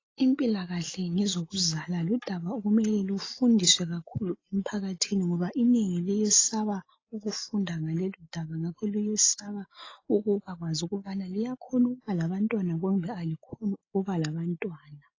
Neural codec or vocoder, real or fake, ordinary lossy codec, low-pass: none; real; AAC, 32 kbps; 7.2 kHz